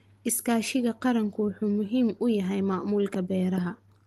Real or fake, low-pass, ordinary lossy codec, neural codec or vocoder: real; 14.4 kHz; Opus, 24 kbps; none